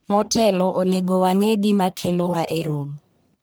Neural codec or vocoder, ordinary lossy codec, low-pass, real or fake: codec, 44.1 kHz, 1.7 kbps, Pupu-Codec; none; none; fake